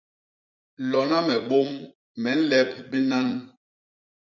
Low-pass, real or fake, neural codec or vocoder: 7.2 kHz; fake; vocoder, 44.1 kHz, 80 mel bands, Vocos